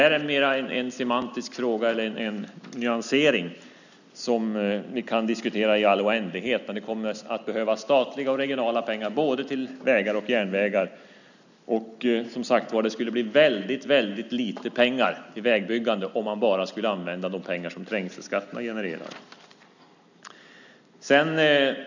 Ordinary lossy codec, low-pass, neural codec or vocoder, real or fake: none; 7.2 kHz; none; real